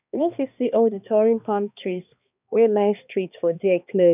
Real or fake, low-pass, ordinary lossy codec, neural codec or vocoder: fake; 3.6 kHz; none; codec, 16 kHz, 2 kbps, X-Codec, HuBERT features, trained on balanced general audio